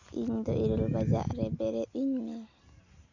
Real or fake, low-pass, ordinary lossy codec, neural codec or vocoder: real; 7.2 kHz; Opus, 64 kbps; none